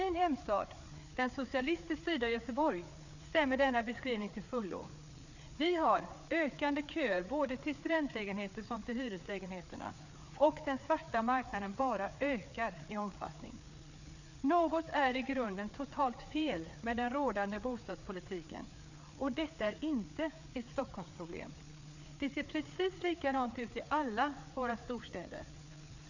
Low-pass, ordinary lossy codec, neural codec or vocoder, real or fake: 7.2 kHz; none; codec, 16 kHz, 4 kbps, FreqCodec, larger model; fake